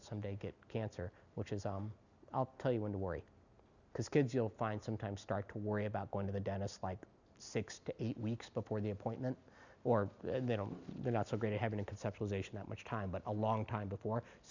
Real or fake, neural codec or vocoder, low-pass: real; none; 7.2 kHz